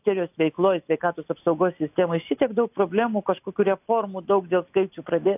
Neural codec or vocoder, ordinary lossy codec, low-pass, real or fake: none; AAC, 32 kbps; 3.6 kHz; real